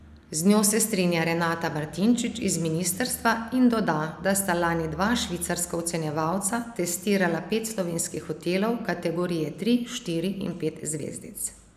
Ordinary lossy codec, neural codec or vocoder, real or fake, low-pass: none; none; real; 14.4 kHz